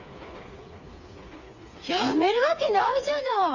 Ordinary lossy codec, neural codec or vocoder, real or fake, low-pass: none; codec, 16 kHz, 4 kbps, FreqCodec, smaller model; fake; 7.2 kHz